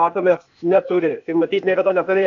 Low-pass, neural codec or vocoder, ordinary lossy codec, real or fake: 7.2 kHz; codec, 16 kHz, 0.8 kbps, ZipCodec; AAC, 64 kbps; fake